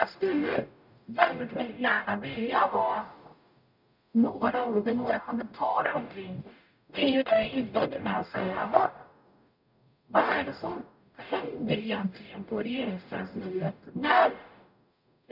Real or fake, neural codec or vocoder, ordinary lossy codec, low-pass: fake; codec, 44.1 kHz, 0.9 kbps, DAC; none; 5.4 kHz